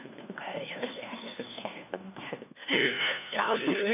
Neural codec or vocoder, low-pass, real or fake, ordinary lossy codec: codec, 16 kHz, 1 kbps, FunCodec, trained on LibriTTS, 50 frames a second; 3.6 kHz; fake; none